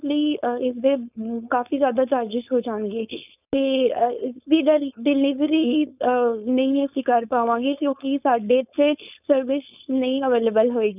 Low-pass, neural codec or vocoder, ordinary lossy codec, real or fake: 3.6 kHz; codec, 16 kHz, 4.8 kbps, FACodec; none; fake